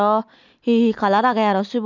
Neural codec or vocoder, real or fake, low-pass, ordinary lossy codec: none; real; 7.2 kHz; none